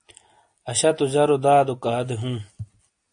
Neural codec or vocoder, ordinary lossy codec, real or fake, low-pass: none; AAC, 64 kbps; real; 9.9 kHz